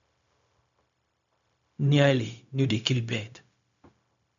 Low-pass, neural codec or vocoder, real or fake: 7.2 kHz; codec, 16 kHz, 0.4 kbps, LongCat-Audio-Codec; fake